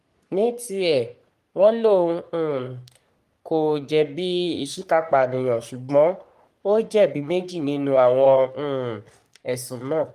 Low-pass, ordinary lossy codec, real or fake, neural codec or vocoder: 14.4 kHz; Opus, 32 kbps; fake; codec, 44.1 kHz, 3.4 kbps, Pupu-Codec